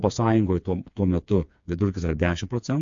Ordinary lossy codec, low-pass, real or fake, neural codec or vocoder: AAC, 64 kbps; 7.2 kHz; fake; codec, 16 kHz, 4 kbps, FreqCodec, smaller model